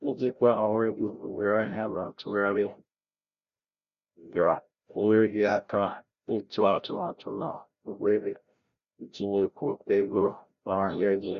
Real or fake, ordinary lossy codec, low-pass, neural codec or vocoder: fake; Opus, 64 kbps; 7.2 kHz; codec, 16 kHz, 0.5 kbps, FreqCodec, larger model